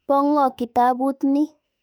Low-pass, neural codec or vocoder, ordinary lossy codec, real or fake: 19.8 kHz; autoencoder, 48 kHz, 32 numbers a frame, DAC-VAE, trained on Japanese speech; none; fake